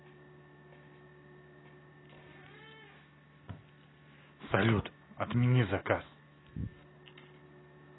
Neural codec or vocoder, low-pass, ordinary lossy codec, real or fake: none; 7.2 kHz; AAC, 16 kbps; real